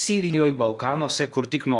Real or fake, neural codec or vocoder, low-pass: fake; codec, 16 kHz in and 24 kHz out, 0.8 kbps, FocalCodec, streaming, 65536 codes; 10.8 kHz